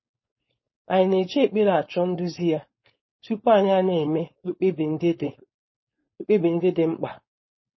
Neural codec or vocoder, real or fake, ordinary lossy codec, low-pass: codec, 16 kHz, 4.8 kbps, FACodec; fake; MP3, 24 kbps; 7.2 kHz